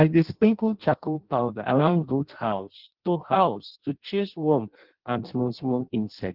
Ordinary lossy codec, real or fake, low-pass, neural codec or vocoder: Opus, 16 kbps; fake; 5.4 kHz; codec, 16 kHz in and 24 kHz out, 0.6 kbps, FireRedTTS-2 codec